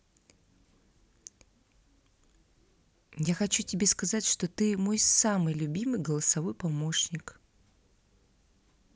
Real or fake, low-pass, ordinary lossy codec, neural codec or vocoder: real; none; none; none